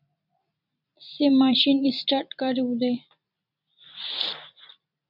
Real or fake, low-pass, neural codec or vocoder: fake; 5.4 kHz; vocoder, 44.1 kHz, 128 mel bands every 256 samples, BigVGAN v2